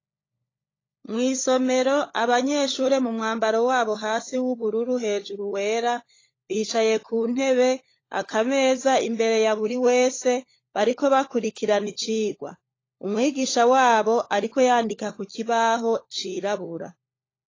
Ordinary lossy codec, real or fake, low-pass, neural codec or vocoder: AAC, 32 kbps; fake; 7.2 kHz; codec, 16 kHz, 16 kbps, FunCodec, trained on LibriTTS, 50 frames a second